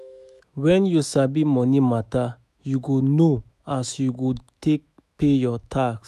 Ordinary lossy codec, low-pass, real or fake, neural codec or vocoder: none; 14.4 kHz; fake; autoencoder, 48 kHz, 128 numbers a frame, DAC-VAE, trained on Japanese speech